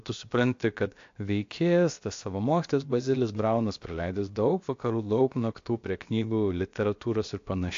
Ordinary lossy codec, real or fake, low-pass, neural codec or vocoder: AAC, 48 kbps; fake; 7.2 kHz; codec, 16 kHz, about 1 kbps, DyCAST, with the encoder's durations